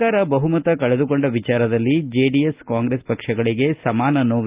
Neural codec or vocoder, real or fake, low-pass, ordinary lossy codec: none; real; 3.6 kHz; Opus, 32 kbps